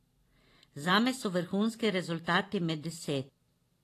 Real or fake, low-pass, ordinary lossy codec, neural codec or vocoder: fake; 14.4 kHz; AAC, 48 kbps; vocoder, 44.1 kHz, 128 mel bands every 512 samples, BigVGAN v2